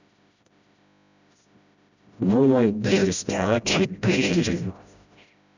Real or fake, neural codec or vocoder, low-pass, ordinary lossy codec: fake; codec, 16 kHz, 0.5 kbps, FreqCodec, smaller model; 7.2 kHz; none